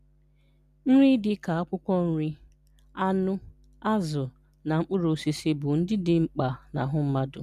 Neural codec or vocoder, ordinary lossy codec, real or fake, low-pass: none; none; real; 14.4 kHz